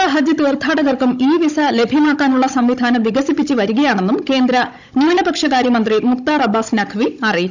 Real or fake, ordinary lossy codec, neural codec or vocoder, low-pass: fake; none; codec, 16 kHz, 16 kbps, FunCodec, trained on Chinese and English, 50 frames a second; 7.2 kHz